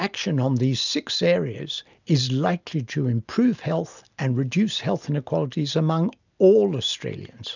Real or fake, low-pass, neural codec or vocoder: real; 7.2 kHz; none